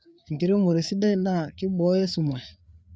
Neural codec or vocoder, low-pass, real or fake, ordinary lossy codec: codec, 16 kHz, 4 kbps, FreqCodec, larger model; none; fake; none